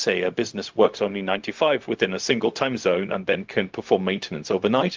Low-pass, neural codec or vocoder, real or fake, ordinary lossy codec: 7.2 kHz; codec, 16 kHz, 0.4 kbps, LongCat-Audio-Codec; fake; Opus, 32 kbps